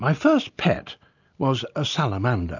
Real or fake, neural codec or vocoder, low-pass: real; none; 7.2 kHz